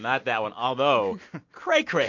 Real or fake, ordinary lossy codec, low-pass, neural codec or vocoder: real; MP3, 48 kbps; 7.2 kHz; none